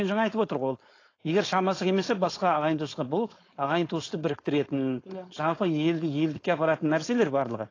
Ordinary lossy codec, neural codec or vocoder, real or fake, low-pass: AAC, 32 kbps; codec, 16 kHz, 4.8 kbps, FACodec; fake; 7.2 kHz